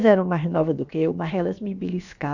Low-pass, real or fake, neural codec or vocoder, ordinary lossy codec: 7.2 kHz; fake; codec, 16 kHz, about 1 kbps, DyCAST, with the encoder's durations; none